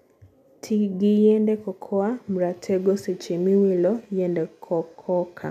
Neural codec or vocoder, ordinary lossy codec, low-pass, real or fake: none; none; 14.4 kHz; real